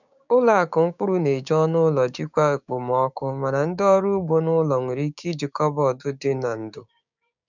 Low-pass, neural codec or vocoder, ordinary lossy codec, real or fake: 7.2 kHz; codec, 16 kHz, 6 kbps, DAC; none; fake